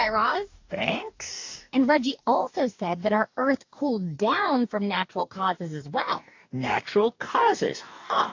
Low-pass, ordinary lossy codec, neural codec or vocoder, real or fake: 7.2 kHz; AAC, 48 kbps; codec, 44.1 kHz, 2.6 kbps, DAC; fake